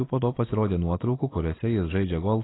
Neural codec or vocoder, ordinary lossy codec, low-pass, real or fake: none; AAC, 16 kbps; 7.2 kHz; real